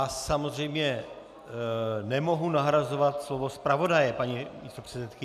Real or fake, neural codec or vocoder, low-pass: real; none; 14.4 kHz